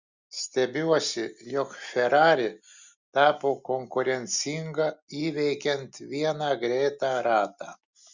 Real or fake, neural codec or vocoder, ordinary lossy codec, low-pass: real; none; Opus, 64 kbps; 7.2 kHz